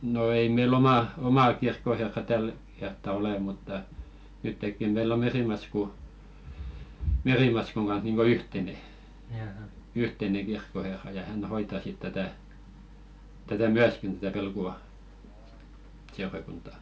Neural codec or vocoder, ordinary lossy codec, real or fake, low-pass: none; none; real; none